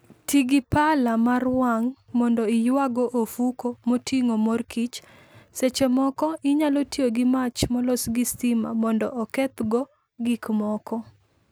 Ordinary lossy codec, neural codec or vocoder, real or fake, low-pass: none; none; real; none